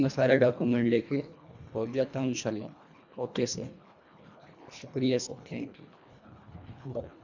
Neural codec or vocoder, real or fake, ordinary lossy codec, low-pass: codec, 24 kHz, 1.5 kbps, HILCodec; fake; none; 7.2 kHz